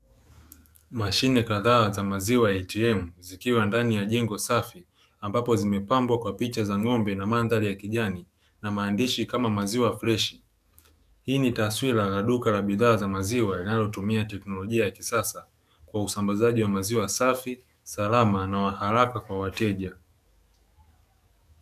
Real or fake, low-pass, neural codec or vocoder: fake; 14.4 kHz; codec, 44.1 kHz, 7.8 kbps, DAC